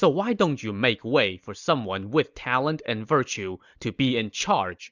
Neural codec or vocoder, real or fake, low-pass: none; real; 7.2 kHz